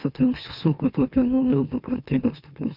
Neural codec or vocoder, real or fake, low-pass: autoencoder, 44.1 kHz, a latent of 192 numbers a frame, MeloTTS; fake; 5.4 kHz